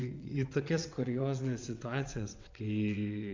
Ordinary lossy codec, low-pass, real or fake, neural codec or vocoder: MP3, 64 kbps; 7.2 kHz; fake; vocoder, 22.05 kHz, 80 mel bands, WaveNeXt